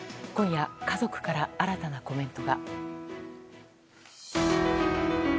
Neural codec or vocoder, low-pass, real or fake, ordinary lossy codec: none; none; real; none